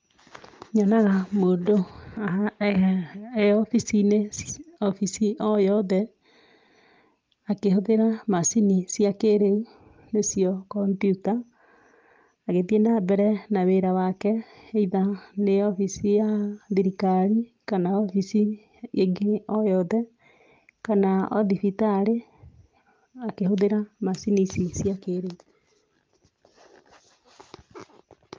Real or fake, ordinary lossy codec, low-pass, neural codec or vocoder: real; Opus, 24 kbps; 7.2 kHz; none